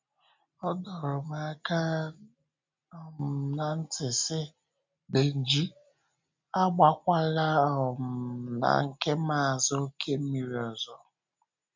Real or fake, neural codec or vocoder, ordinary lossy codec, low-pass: real; none; MP3, 64 kbps; 7.2 kHz